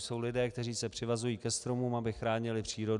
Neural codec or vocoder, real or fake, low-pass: none; real; 10.8 kHz